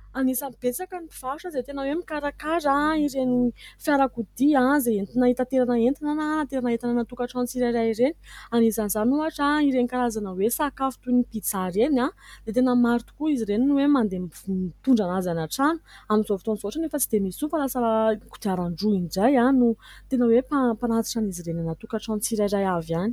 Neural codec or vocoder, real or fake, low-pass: none; real; 19.8 kHz